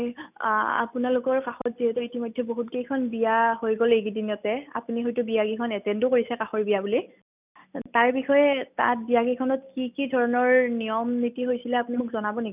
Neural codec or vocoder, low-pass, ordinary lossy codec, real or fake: none; 3.6 kHz; none; real